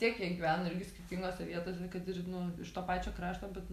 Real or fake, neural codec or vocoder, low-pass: real; none; 14.4 kHz